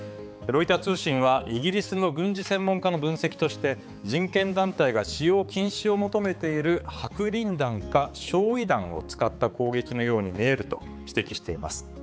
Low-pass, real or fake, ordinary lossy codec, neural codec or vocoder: none; fake; none; codec, 16 kHz, 4 kbps, X-Codec, HuBERT features, trained on balanced general audio